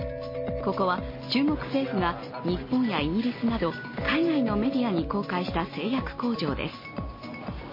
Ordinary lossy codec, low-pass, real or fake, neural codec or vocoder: MP3, 24 kbps; 5.4 kHz; real; none